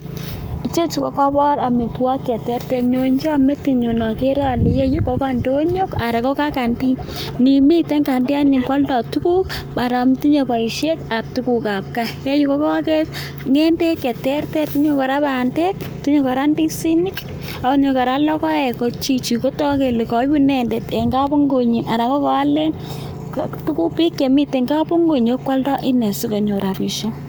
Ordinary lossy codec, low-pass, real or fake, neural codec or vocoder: none; none; fake; codec, 44.1 kHz, 7.8 kbps, Pupu-Codec